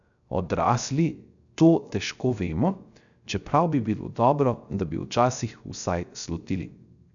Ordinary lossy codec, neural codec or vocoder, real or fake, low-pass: none; codec, 16 kHz, 0.3 kbps, FocalCodec; fake; 7.2 kHz